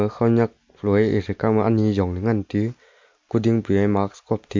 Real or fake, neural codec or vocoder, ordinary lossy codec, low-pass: real; none; MP3, 48 kbps; 7.2 kHz